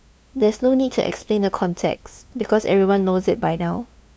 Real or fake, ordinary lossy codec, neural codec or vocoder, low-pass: fake; none; codec, 16 kHz, 2 kbps, FunCodec, trained on LibriTTS, 25 frames a second; none